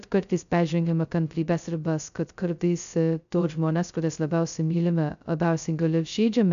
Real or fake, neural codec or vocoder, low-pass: fake; codec, 16 kHz, 0.2 kbps, FocalCodec; 7.2 kHz